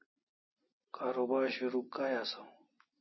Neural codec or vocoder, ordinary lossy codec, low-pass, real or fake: none; MP3, 24 kbps; 7.2 kHz; real